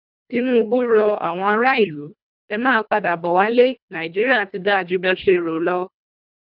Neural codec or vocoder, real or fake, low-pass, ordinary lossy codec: codec, 24 kHz, 1.5 kbps, HILCodec; fake; 5.4 kHz; none